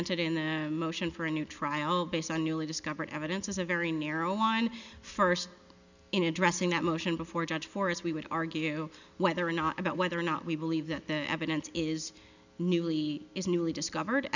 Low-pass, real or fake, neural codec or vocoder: 7.2 kHz; real; none